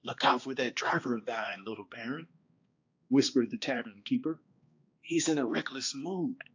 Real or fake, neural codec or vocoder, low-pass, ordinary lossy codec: fake; codec, 16 kHz, 2 kbps, X-Codec, HuBERT features, trained on balanced general audio; 7.2 kHz; AAC, 48 kbps